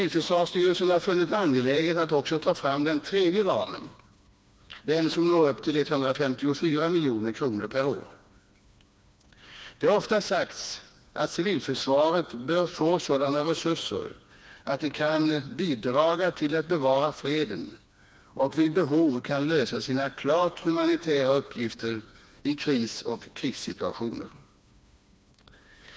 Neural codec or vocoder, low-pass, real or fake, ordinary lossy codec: codec, 16 kHz, 2 kbps, FreqCodec, smaller model; none; fake; none